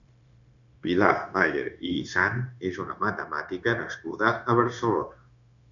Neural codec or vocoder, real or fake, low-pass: codec, 16 kHz, 0.9 kbps, LongCat-Audio-Codec; fake; 7.2 kHz